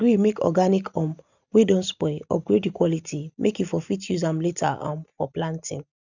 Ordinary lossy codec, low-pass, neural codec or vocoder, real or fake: none; 7.2 kHz; none; real